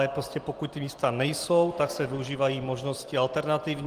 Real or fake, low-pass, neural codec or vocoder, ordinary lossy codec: real; 14.4 kHz; none; Opus, 24 kbps